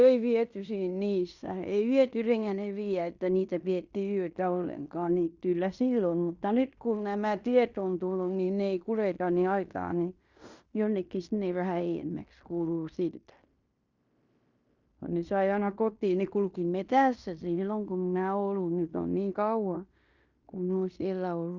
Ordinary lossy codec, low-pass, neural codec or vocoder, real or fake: Opus, 64 kbps; 7.2 kHz; codec, 16 kHz in and 24 kHz out, 0.9 kbps, LongCat-Audio-Codec, fine tuned four codebook decoder; fake